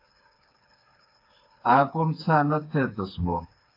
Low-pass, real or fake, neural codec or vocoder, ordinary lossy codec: 5.4 kHz; fake; codec, 16 kHz, 4 kbps, FreqCodec, smaller model; AAC, 32 kbps